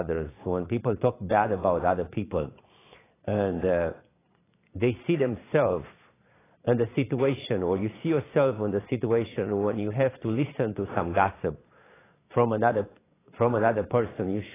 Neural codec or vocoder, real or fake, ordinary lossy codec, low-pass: vocoder, 22.05 kHz, 80 mel bands, Vocos; fake; AAC, 16 kbps; 3.6 kHz